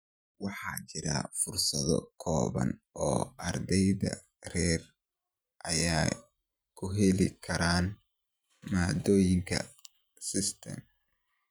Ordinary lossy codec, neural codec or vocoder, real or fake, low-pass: none; vocoder, 44.1 kHz, 128 mel bands every 512 samples, BigVGAN v2; fake; none